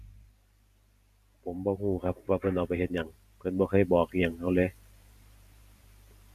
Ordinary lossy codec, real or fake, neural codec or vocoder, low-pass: none; fake; vocoder, 44.1 kHz, 128 mel bands every 512 samples, BigVGAN v2; 14.4 kHz